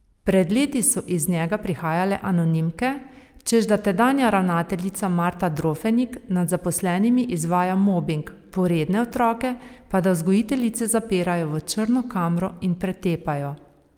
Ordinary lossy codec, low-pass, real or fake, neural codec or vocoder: Opus, 32 kbps; 19.8 kHz; real; none